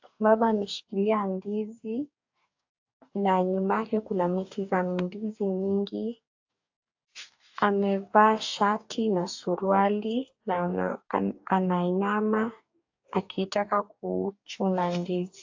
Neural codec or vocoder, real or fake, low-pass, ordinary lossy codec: codec, 44.1 kHz, 2.6 kbps, DAC; fake; 7.2 kHz; AAC, 48 kbps